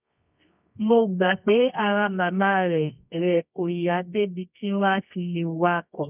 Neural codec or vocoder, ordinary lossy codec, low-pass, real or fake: codec, 24 kHz, 0.9 kbps, WavTokenizer, medium music audio release; none; 3.6 kHz; fake